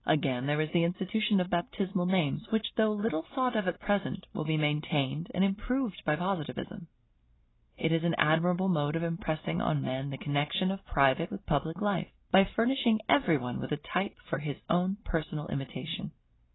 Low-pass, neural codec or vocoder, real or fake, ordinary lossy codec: 7.2 kHz; none; real; AAC, 16 kbps